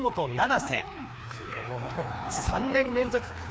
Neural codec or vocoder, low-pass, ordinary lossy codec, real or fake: codec, 16 kHz, 2 kbps, FreqCodec, larger model; none; none; fake